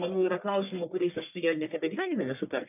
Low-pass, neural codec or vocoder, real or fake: 3.6 kHz; codec, 44.1 kHz, 1.7 kbps, Pupu-Codec; fake